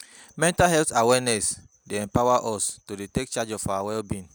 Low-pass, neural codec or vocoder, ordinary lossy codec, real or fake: none; none; none; real